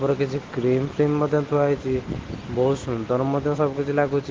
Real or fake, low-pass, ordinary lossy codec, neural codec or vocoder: real; 7.2 kHz; Opus, 16 kbps; none